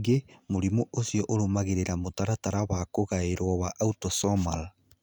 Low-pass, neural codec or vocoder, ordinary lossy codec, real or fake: none; none; none; real